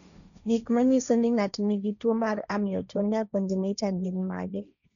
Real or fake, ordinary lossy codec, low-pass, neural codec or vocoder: fake; none; 7.2 kHz; codec, 16 kHz, 1.1 kbps, Voila-Tokenizer